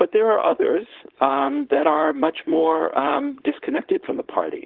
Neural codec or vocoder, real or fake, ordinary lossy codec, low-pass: codec, 16 kHz, 4.8 kbps, FACodec; fake; Opus, 16 kbps; 5.4 kHz